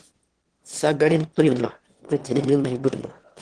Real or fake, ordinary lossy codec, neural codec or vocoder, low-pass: fake; Opus, 16 kbps; autoencoder, 22.05 kHz, a latent of 192 numbers a frame, VITS, trained on one speaker; 9.9 kHz